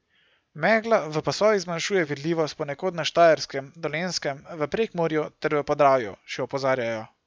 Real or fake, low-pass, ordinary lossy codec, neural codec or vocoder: real; none; none; none